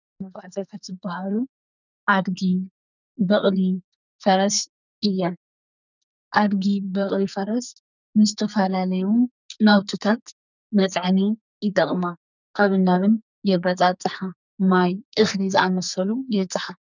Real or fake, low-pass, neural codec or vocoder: fake; 7.2 kHz; codec, 44.1 kHz, 2.6 kbps, SNAC